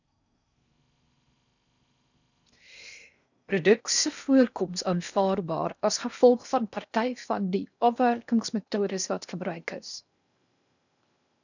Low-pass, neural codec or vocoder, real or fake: 7.2 kHz; codec, 16 kHz in and 24 kHz out, 0.6 kbps, FocalCodec, streaming, 4096 codes; fake